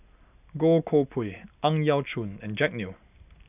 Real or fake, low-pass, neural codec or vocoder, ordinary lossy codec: real; 3.6 kHz; none; none